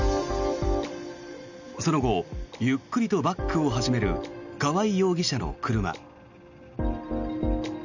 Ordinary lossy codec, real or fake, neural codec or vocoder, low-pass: none; real; none; 7.2 kHz